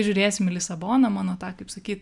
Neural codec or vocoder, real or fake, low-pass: none; real; 10.8 kHz